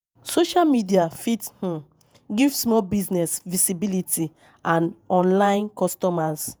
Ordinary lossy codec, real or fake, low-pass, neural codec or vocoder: none; fake; none; vocoder, 48 kHz, 128 mel bands, Vocos